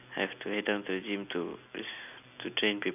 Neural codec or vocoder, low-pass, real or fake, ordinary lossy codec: none; 3.6 kHz; real; none